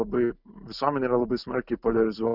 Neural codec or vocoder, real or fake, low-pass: none; real; 5.4 kHz